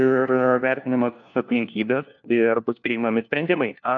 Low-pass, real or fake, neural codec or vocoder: 7.2 kHz; fake; codec, 16 kHz, 1 kbps, FunCodec, trained on LibriTTS, 50 frames a second